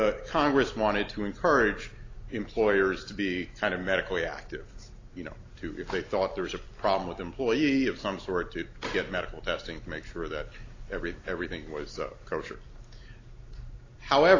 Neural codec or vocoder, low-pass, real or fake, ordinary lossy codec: none; 7.2 kHz; real; AAC, 32 kbps